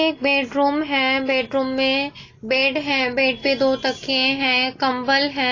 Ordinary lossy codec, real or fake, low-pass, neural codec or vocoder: AAC, 32 kbps; real; 7.2 kHz; none